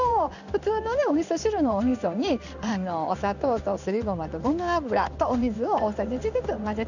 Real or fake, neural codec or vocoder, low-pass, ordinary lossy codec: fake; codec, 16 kHz in and 24 kHz out, 1 kbps, XY-Tokenizer; 7.2 kHz; none